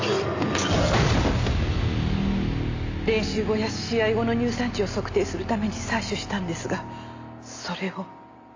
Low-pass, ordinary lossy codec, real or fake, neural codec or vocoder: 7.2 kHz; AAC, 48 kbps; real; none